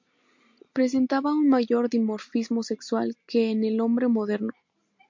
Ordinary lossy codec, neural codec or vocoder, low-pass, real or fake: MP3, 48 kbps; none; 7.2 kHz; real